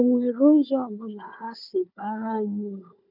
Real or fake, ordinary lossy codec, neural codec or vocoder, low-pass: fake; none; codec, 16 kHz, 4 kbps, FunCodec, trained on Chinese and English, 50 frames a second; 5.4 kHz